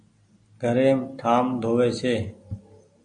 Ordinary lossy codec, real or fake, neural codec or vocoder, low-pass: AAC, 64 kbps; real; none; 9.9 kHz